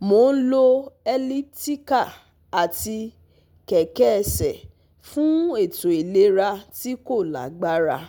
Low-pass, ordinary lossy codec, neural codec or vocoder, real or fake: none; none; none; real